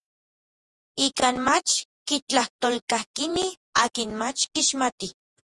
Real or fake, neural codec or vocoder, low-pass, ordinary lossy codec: fake; vocoder, 48 kHz, 128 mel bands, Vocos; 10.8 kHz; Opus, 32 kbps